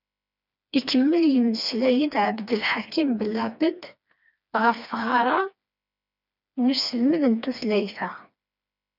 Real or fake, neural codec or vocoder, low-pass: fake; codec, 16 kHz, 2 kbps, FreqCodec, smaller model; 5.4 kHz